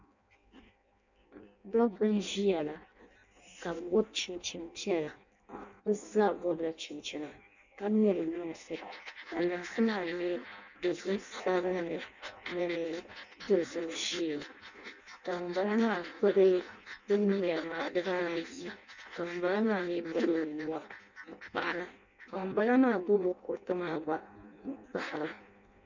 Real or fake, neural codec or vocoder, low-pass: fake; codec, 16 kHz in and 24 kHz out, 0.6 kbps, FireRedTTS-2 codec; 7.2 kHz